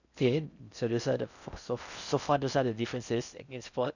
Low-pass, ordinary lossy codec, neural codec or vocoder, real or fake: 7.2 kHz; none; codec, 16 kHz in and 24 kHz out, 0.6 kbps, FocalCodec, streaming, 4096 codes; fake